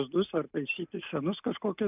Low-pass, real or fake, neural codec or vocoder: 3.6 kHz; real; none